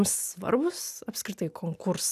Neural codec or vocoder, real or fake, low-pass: none; real; 14.4 kHz